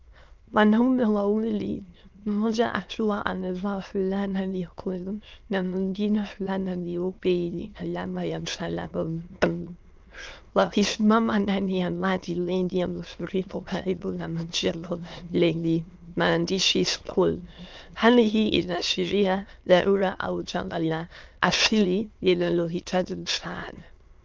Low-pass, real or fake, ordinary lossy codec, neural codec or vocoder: 7.2 kHz; fake; Opus, 24 kbps; autoencoder, 22.05 kHz, a latent of 192 numbers a frame, VITS, trained on many speakers